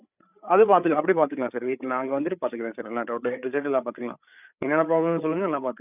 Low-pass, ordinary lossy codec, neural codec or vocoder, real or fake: 3.6 kHz; none; codec, 16 kHz, 8 kbps, FreqCodec, larger model; fake